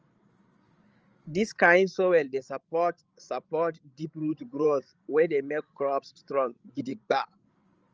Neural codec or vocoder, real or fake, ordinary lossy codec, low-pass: codec, 16 kHz, 16 kbps, FreqCodec, larger model; fake; Opus, 24 kbps; 7.2 kHz